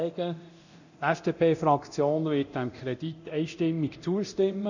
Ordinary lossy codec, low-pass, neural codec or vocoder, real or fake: none; 7.2 kHz; codec, 24 kHz, 0.9 kbps, DualCodec; fake